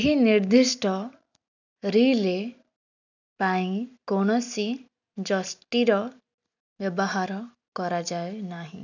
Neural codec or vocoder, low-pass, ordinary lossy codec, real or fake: none; 7.2 kHz; none; real